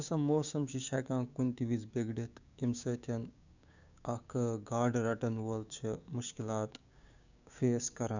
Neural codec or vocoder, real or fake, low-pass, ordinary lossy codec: codec, 16 kHz, 6 kbps, DAC; fake; 7.2 kHz; none